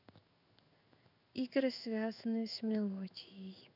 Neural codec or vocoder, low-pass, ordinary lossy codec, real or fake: codec, 16 kHz in and 24 kHz out, 1 kbps, XY-Tokenizer; 5.4 kHz; none; fake